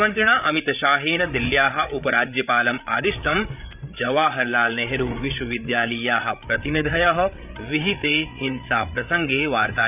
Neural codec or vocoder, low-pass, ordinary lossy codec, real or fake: codec, 16 kHz, 8 kbps, FreqCodec, larger model; 3.6 kHz; none; fake